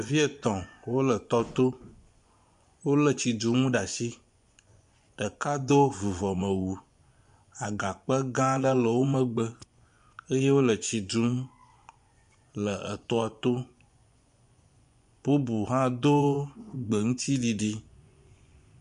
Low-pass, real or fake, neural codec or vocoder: 10.8 kHz; fake; vocoder, 24 kHz, 100 mel bands, Vocos